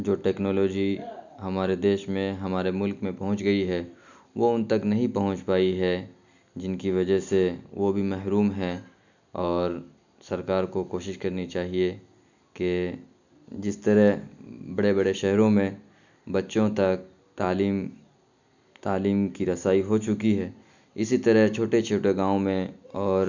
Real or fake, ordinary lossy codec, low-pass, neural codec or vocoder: real; none; 7.2 kHz; none